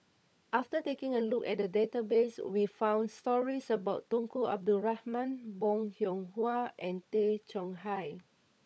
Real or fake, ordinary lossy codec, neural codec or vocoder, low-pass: fake; none; codec, 16 kHz, 16 kbps, FunCodec, trained on LibriTTS, 50 frames a second; none